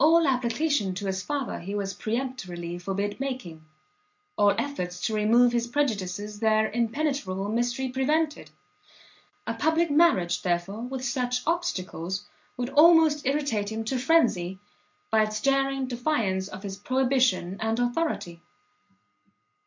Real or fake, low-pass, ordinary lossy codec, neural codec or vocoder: real; 7.2 kHz; MP3, 48 kbps; none